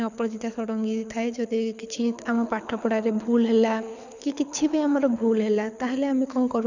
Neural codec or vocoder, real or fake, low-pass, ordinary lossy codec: codec, 24 kHz, 6 kbps, HILCodec; fake; 7.2 kHz; none